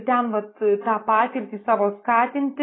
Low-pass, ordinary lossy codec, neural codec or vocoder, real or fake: 7.2 kHz; AAC, 16 kbps; none; real